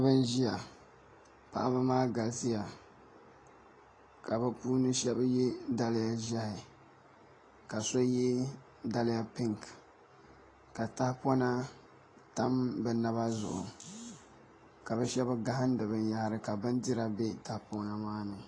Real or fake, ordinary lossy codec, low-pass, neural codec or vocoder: real; AAC, 32 kbps; 9.9 kHz; none